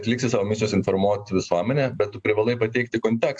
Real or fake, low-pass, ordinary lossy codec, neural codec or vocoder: real; 9.9 kHz; AAC, 64 kbps; none